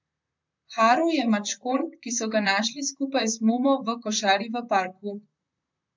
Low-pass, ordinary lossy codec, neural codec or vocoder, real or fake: 7.2 kHz; MP3, 64 kbps; vocoder, 44.1 kHz, 128 mel bands, Pupu-Vocoder; fake